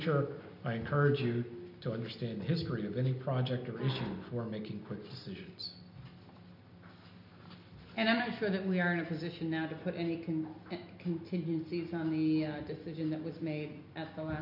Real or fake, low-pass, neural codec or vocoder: real; 5.4 kHz; none